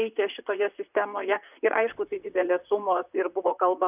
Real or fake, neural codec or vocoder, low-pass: fake; vocoder, 44.1 kHz, 128 mel bands, Pupu-Vocoder; 3.6 kHz